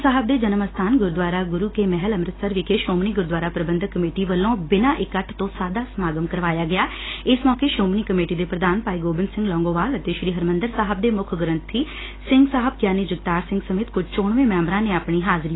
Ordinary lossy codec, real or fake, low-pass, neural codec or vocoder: AAC, 16 kbps; real; 7.2 kHz; none